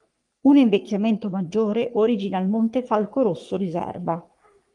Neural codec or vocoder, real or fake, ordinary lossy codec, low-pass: codec, 44.1 kHz, 3.4 kbps, Pupu-Codec; fake; Opus, 32 kbps; 10.8 kHz